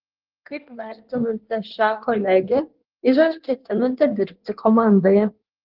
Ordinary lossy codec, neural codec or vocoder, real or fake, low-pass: Opus, 16 kbps; codec, 16 kHz in and 24 kHz out, 1.1 kbps, FireRedTTS-2 codec; fake; 5.4 kHz